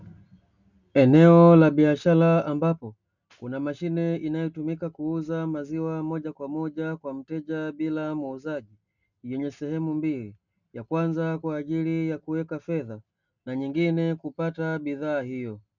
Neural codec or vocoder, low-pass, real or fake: none; 7.2 kHz; real